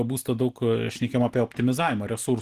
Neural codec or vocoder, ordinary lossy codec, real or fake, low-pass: none; Opus, 16 kbps; real; 14.4 kHz